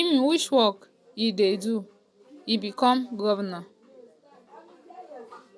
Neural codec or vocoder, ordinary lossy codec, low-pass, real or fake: none; none; none; real